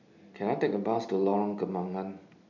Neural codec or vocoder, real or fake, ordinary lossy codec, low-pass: none; real; none; 7.2 kHz